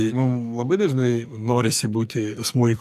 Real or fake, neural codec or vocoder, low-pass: fake; codec, 44.1 kHz, 2.6 kbps, SNAC; 14.4 kHz